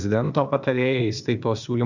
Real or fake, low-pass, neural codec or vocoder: fake; 7.2 kHz; codec, 16 kHz, 0.8 kbps, ZipCodec